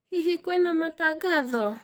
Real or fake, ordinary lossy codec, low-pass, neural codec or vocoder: fake; none; none; codec, 44.1 kHz, 2.6 kbps, SNAC